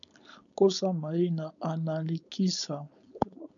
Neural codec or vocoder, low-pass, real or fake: codec, 16 kHz, 4.8 kbps, FACodec; 7.2 kHz; fake